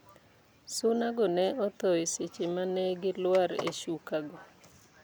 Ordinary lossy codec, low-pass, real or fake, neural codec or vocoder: none; none; real; none